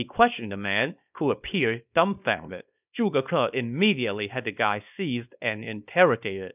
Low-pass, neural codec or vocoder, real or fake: 3.6 kHz; codec, 24 kHz, 0.9 kbps, WavTokenizer, small release; fake